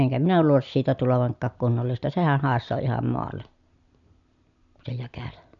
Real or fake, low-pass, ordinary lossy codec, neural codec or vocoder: real; 7.2 kHz; none; none